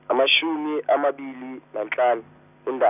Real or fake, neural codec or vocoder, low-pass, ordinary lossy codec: real; none; 3.6 kHz; none